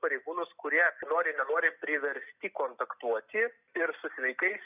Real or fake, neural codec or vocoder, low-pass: real; none; 3.6 kHz